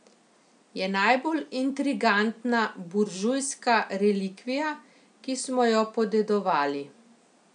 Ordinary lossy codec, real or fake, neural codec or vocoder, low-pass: none; real; none; 9.9 kHz